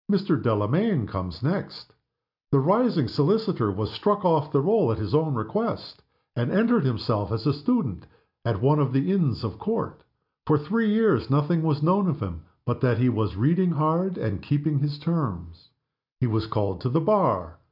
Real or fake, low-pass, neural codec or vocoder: real; 5.4 kHz; none